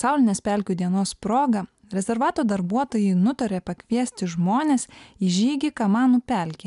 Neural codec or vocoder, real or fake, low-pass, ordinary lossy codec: none; real; 10.8 kHz; MP3, 96 kbps